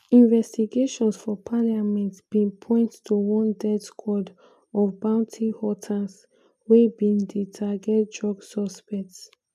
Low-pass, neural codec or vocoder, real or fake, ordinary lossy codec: 14.4 kHz; none; real; none